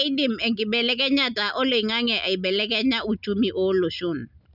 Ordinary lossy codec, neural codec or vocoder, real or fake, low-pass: none; none; real; 5.4 kHz